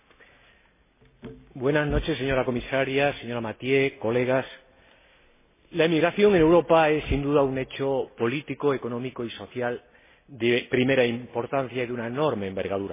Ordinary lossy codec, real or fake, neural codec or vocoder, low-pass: MP3, 16 kbps; real; none; 3.6 kHz